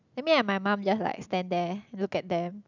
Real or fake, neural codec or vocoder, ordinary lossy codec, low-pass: real; none; none; 7.2 kHz